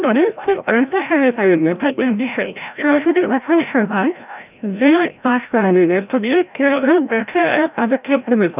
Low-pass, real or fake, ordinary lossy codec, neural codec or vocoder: 3.6 kHz; fake; none; codec, 16 kHz, 0.5 kbps, FreqCodec, larger model